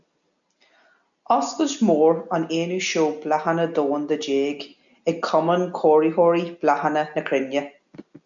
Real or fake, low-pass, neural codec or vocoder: real; 7.2 kHz; none